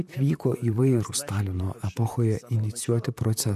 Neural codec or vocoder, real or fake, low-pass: vocoder, 44.1 kHz, 128 mel bands, Pupu-Vocoder; fake; 14.4 kHz